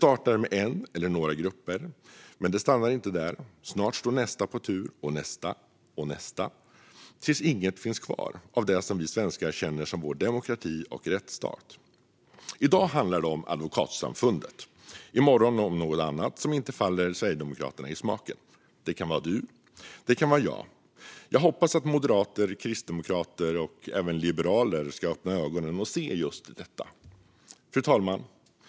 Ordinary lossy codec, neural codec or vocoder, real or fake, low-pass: none; none; real; none